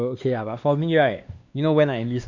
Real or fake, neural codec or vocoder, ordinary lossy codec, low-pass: fake; codec, 16 kHz, 2 kbps, X-Codec, WavLM features, trained on Multilingual LibriSpeech; none; 7.2 kHz